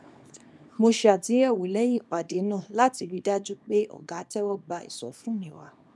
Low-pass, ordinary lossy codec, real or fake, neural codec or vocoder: none; none; fake; codec, 24 kHz, 0.9 kbps, WavTokenizer, small release